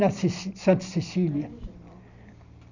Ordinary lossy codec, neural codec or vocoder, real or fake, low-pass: none; none; real; 7.2 kHz